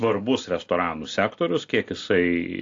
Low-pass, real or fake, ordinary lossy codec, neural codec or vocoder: 7.2 kHz; real; AAC, 48 kbps; none